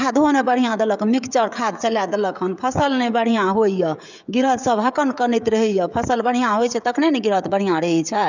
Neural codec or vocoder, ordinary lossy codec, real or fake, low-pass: codec, 16 kHz, 16 kbps, FreqCodec, smaller model; none; fake; 7.2 kHz